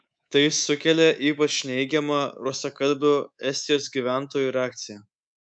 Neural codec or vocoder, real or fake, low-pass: codec, 24 kHz, 3.1 kbps, DualCodec; fake; 9.9 kHz